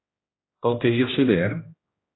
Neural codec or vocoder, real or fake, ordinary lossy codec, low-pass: codec, 16 kHz, 1 kbps, X-Codec, HuBERT features, trained on general audio; fake; AAC, 16 kbps; 7.2 kHz